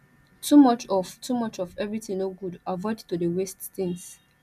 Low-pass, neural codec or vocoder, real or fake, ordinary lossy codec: 14.4 kHz; none; real; none